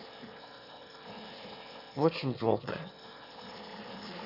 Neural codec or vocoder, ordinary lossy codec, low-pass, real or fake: autoencoder, 22.05 kHz, a latent of 192 numbers a frame, VITS, trained on one speaker; none; 5.4 kHz; fake